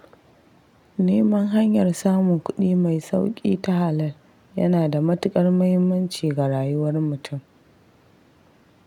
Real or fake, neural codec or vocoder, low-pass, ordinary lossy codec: real; none; 19.8 kHz; none